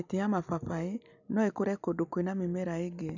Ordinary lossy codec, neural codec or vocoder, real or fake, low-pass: none; none; real; 7.2 kHz